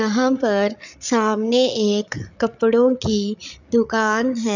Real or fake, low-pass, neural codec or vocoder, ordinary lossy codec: fake; 7.2 kHz; codec, 16 kHz, 8 kbps, FreqCodec, larger model; none